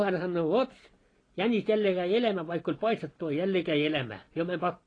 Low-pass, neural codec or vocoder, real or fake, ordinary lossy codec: 9.9 kHz; vocoder, 44.1 kHz, 128 mel bands every 512 samples, BigVGAN v2; fake; AAC, 32 kbps